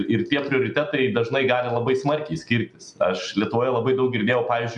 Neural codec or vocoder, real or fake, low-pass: none; real; 10.8 kHz